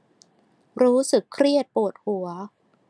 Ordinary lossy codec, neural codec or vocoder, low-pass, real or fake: none; none; none; real